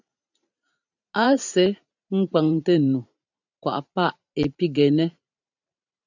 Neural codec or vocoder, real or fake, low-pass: vocoder, 44.1 kHz, 128 mel bands every 512 samples, BigVGAN v2; fake; 7.2 kHz